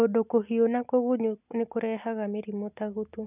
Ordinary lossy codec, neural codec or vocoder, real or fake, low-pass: none; none; real; 3.6 kHz